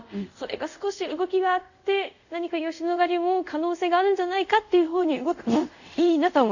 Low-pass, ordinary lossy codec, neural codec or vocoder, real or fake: 7.2 kHz; none; codec, 24 kHz, 0.5 kbps, DualCodec; fake